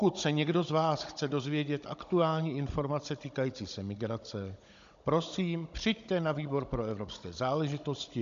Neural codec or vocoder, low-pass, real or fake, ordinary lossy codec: codec, 16 kHz, 16 kbps, FunCodec, trained on Chinese and English, 50 frames a second; 7.2 kHz; fake; MP3, 64 kbps